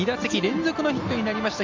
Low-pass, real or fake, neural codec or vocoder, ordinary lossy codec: 7.2 kHz; real; none; MP3, 48 kbps